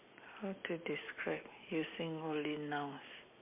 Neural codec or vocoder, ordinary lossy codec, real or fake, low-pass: none; MP3, 32 kbps; real; 3.6 kHz